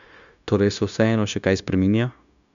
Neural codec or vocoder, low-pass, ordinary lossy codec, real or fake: codec, 16 kHz, 0.9 kbps, LongCat-Audio-Codec; 7.2 kHz; none; fake